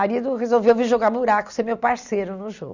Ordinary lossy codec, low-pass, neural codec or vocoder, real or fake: Opus, 64 kbps; 7.2 kHz; none; real